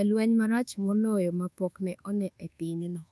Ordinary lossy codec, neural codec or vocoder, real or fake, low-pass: none; codec, 24 kHz, 1.2 kbps, DualCodec; fake; none